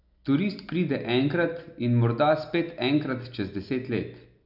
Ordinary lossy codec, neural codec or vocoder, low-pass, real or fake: none; none; 5.4 kHz; real